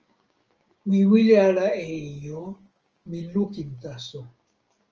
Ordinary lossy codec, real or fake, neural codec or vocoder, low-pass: Opus, 24 kbps; fake; codec, 16 kHz, 6 kbps, DAC; 7.2 kHz